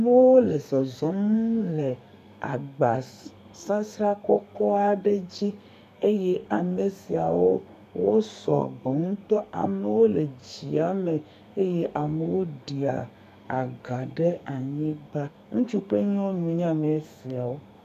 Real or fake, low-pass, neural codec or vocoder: fake; 14.4 kHz; codec, 32 kHz, 1.9 kbps, SNAC